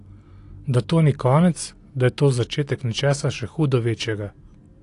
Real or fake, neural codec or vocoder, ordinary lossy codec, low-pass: real; none; AAC, 48 kbps; 10.8 kHz